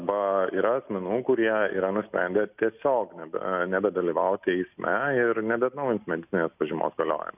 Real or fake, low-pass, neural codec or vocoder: real; 3.6 kHz; none